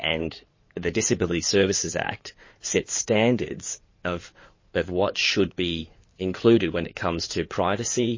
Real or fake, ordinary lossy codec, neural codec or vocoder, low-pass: fake; MP3, 32 kbps; codec, 16 kHz in and 24 kHz out, 2.2 kbps, FireRedTTS-2 codec; 7.2 kHz